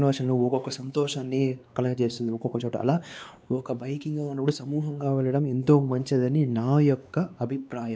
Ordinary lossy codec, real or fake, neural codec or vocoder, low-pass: none; fake; codec, 16 kHz, 2 kbps, X-Codec, WavLM features, trained on Multilingual LibriSpeech; none